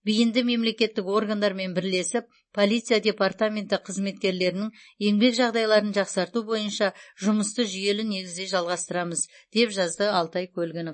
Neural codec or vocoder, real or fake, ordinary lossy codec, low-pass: none; real; MP3, 32 kbps; 9.9 kHz